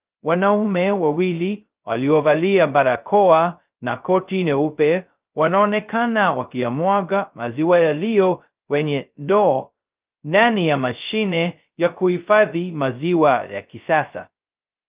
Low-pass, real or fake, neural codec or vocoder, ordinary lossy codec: 3.6 kHz; fake; codec, 16 kHz, 0.2 kbps, FocalCodec; Opus, 24 kbps